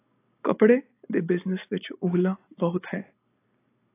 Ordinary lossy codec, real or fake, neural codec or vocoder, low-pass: AAC, 24 kbps; real; none; 3.6 kHz